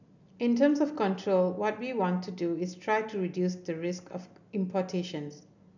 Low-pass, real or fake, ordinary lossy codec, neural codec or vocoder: 7.2 kHz; real; none; none